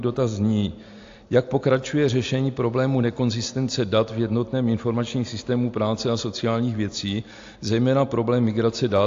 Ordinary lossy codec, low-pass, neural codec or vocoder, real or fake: AAC, 48 kbps; 7.2 kHz; none; real